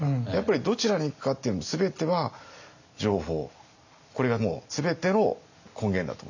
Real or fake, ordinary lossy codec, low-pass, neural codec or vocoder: real; none; 7.2 kHz; none